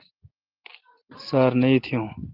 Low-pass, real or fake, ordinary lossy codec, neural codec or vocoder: 5.4 kHz; real; Opus, 16 kbps; none